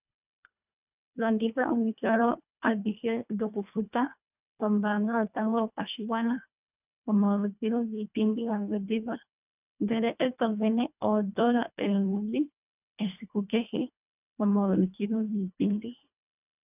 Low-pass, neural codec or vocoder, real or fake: 3.6 kHz; codec, 24 kHz, 1.5 kbps, HILCodec; fake